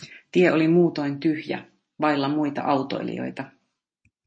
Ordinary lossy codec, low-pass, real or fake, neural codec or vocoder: MP3, 32 kbps; 9.9 kHz; real; none